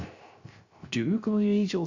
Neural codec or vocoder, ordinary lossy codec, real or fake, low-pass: codec, 16 kHz, 0.3 kbps, FocalCodec; Opus, 64 kbps; fake; 7.2 kHz